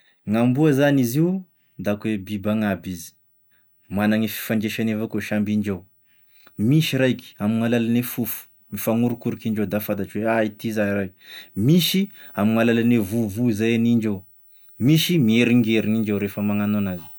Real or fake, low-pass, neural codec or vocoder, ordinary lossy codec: real; none; none; none